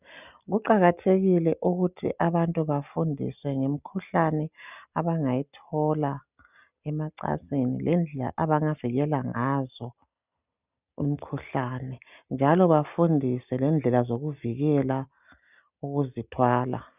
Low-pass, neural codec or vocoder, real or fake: 3.6 kHz; none; real